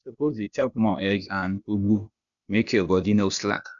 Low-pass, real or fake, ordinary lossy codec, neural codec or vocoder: 7.2 kHz; fake; none; codec, 16 kHz, 0.8 kbps, ZipCodec